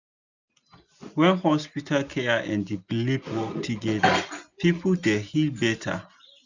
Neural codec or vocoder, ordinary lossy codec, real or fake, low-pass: none; none; real; 7.2 kHz